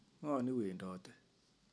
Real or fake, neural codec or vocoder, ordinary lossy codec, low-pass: real; none; none; none